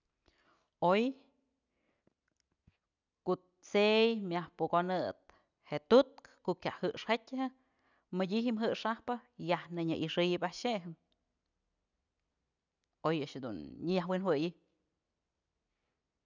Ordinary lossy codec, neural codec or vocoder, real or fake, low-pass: none; none; real; 7.2 kHz